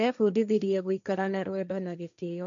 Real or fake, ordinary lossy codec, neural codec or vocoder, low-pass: fake; none; codec, 16 kHz, 1.1 kbps, Voila-Tokenizer; 7.2 kHz